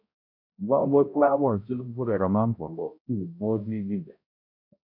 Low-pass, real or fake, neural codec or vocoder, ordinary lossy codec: 5.4 kHz; fake; codec, 16 kHz, 0.5 kbps, X-Codec, HuBERT features, trained on balanced general audio; AAC, 48 kbps